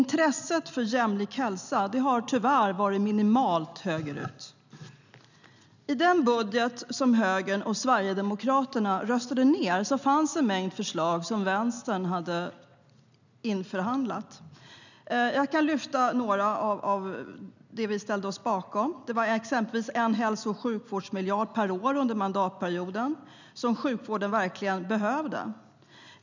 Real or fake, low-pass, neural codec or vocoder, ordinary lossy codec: real; 7.2 kHz; none; none